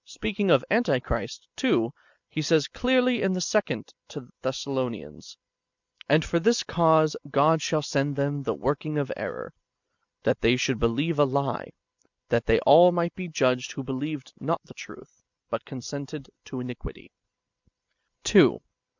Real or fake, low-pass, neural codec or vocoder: real; 7.2 kHz; none